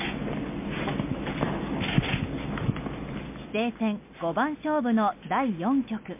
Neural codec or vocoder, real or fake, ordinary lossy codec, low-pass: none; real; MP3, 32 kbps; 3.6 kHz